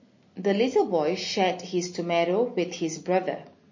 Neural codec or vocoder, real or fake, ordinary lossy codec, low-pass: none; real; MP3, 32 kbps; 7.2 kHz